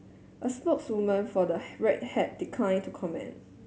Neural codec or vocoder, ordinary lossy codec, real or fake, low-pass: none; none; real; none